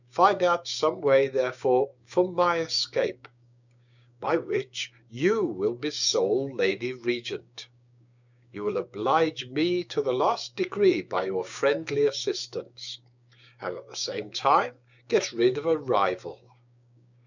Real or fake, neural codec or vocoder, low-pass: fake; codec, 16 kHz, 6 kbps, DAC; 7.2 kHz